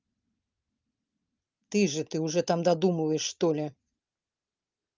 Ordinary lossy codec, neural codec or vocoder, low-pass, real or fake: Opus, 32 kbps; none; 7.2 kHz; real